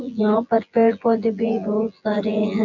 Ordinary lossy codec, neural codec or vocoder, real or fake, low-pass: none; vocoder, 24 kHz, 100 mel bands, Vocos; fake; 7.2 kHz